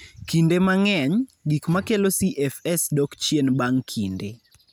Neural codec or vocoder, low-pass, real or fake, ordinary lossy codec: none; none; real; none